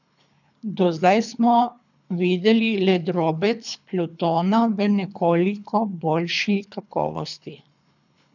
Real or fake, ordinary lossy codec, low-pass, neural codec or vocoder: fake; none; 7.2 kHz; codec, 24 kHz, 3 kbps, HILCodec